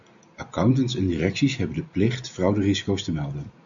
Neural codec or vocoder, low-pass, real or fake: none; 7.2 kHz; real